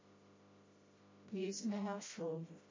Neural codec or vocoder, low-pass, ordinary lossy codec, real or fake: codec, 16 kHz, 0.5 kbps, FreqCodec, smaller model; 7.2 kHz; MP3, 32 kbps; fake